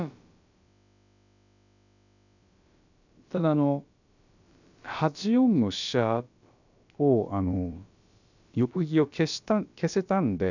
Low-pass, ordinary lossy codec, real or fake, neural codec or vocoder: 7.2 kHz; none; fake; codec, 16 kHz, about 1 kbps, DyCAST, with the encoder's durations